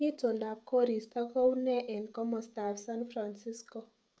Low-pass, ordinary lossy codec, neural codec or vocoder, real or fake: none; none; codec, 16 kHz, 16 kbps, FreqCodec, smaller model; fake